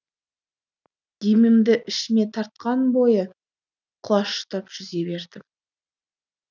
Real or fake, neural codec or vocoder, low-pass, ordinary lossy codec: real; none; 7.2 kHz; none